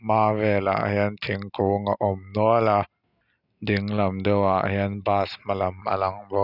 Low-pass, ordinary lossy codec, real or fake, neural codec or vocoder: 5.4 kHz; none; real; none